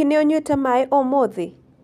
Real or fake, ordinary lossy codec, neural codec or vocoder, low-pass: real; none; none; 14.4 kHz